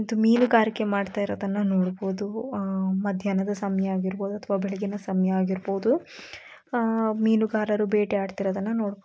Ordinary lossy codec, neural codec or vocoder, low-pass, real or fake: none; none; none; real